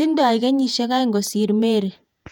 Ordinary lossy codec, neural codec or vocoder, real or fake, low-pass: none; vocoder, 48 kHz, 128 mel bands, Vocos; fake; 19.8 kHz